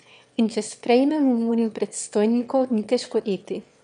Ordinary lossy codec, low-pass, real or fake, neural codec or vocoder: MP3, 96 kbps; 9.9 kHz; fake; autoencoder, 22.05 kHz, a latent of 192 numbers a frame, VITS, trained on one speaker